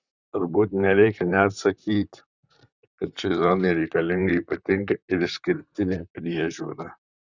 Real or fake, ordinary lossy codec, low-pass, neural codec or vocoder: fake; Opus, 64 kbps; 7.2 kHz; vocoder, 44.1 kHz, 128 mel bands, Pupu-Vocoder